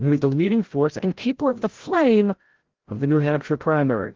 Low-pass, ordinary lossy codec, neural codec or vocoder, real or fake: 7.2 kHz; Opus, 16 kbps; codec, 16 kHz, 0.5 kbps, FreqCodec, larger model; fake